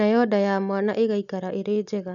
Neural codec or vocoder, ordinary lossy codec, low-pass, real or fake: none; none; 7.2 kHz; real